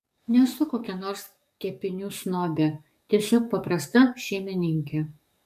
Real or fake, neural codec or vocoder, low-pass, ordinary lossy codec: fake; codec, 44.1 kHz, 7.8 kbps, DAC; 14.4 kHz; MP3, 96 kbps